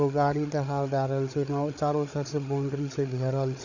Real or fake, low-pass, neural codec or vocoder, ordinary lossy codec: fake; 7.2 kHz; codec, 16 kHz, 8 kbps, FreqCodec, larger model; none